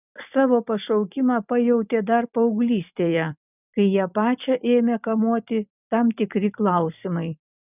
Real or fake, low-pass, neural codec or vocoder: fake; 3.6 kHz; vocoder, 44.1 kHz, 128 mel bands every 256 samples, BigVGAN v2